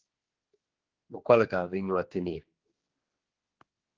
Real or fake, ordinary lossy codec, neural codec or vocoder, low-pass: fake; Opus, 16 kbps; codec, 16 kHz, 2 kbps, X-Codec, HuBERT features, trained on general audio; 7.2 kHz